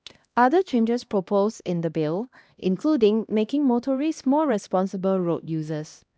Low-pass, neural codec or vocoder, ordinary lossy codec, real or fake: none; codec, 16 kHz, 1 kbps, X-Codec, HuBERT features, trained on LibriSpeech; none; fake